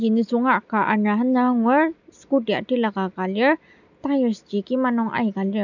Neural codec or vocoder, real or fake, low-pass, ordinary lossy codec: none; real; 7.2 kHz; none